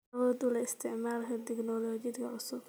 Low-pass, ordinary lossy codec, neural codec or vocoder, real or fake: none; none; none; real